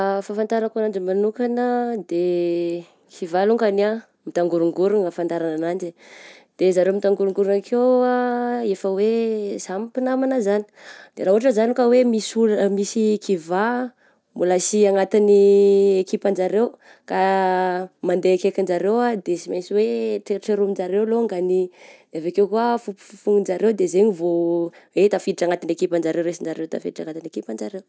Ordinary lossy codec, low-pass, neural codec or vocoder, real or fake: none; none; none; real